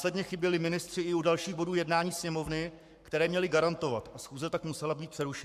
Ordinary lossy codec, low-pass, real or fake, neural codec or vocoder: MP3, 96 kbps; 14.4 kHz; fake; codec, 44.1 kHz, 7.8 kbps, Pupu-Codec